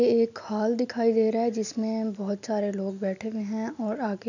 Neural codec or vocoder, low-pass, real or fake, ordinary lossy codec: none; 7.2 kHz; real; none